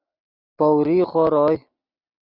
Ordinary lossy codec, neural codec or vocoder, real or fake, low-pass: Opus, 64 kbps; none; real; 5.4 kHz